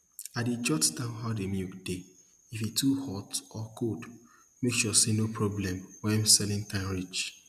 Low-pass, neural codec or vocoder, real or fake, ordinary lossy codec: 14.4 kHz; none; real; none